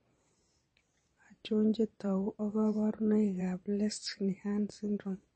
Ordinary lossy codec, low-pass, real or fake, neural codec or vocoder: MP3, 32 kbps; 9.9 kHz; fake; vocoder, 22.05 kHz, 80 mel bands, Vocos